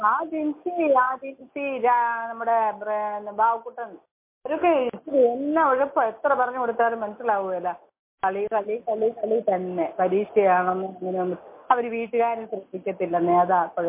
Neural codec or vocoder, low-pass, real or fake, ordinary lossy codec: none; 3.6 kHz; real; MP3, 24 kbps